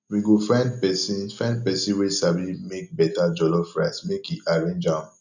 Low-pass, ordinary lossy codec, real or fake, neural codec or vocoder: 7.2 kHz; none; real; none